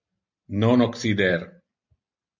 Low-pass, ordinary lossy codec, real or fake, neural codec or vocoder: 7.2 kHz; MP3, 48 kbps; real; none